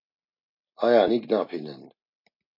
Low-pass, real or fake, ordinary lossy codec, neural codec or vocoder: 5.4 kHz; real; MP3, 32 kbps; none